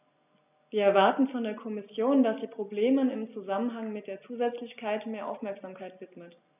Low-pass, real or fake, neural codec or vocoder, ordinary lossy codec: 3.6 kHz; real; none; none